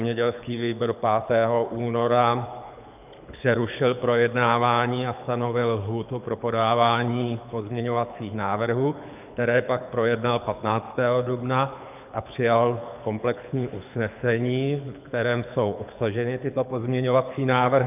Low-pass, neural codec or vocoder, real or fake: 3.6 kHz; codec, 24 kHz, 6 kbps, HILCodec; fake